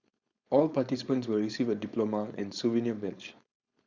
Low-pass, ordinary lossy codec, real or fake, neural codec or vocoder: 7.2 kHz; Opus, 64 kbps; fake; codec, 16 kHz, 4.8 kbps, FACodec